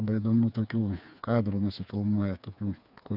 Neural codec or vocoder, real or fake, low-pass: codec, 16 kHz, 4 kbps, FreqCodec, smaller model; fake; 5.4 kHz